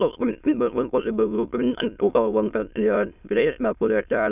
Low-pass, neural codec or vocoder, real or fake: 3.6 kHz; autoencoder, 22.05 kHz, a latent of 192 numbers a frame, VITS, trained on many speakers; fake